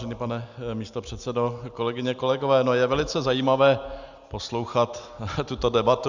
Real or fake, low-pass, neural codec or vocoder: real; 7.2 kHz; none